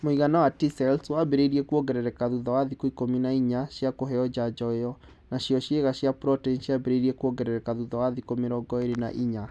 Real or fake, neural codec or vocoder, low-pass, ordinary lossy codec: real; none; none; none